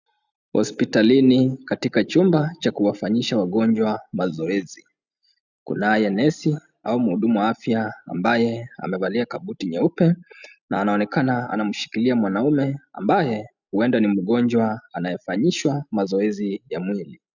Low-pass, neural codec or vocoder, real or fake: 7.2 kHz; none; real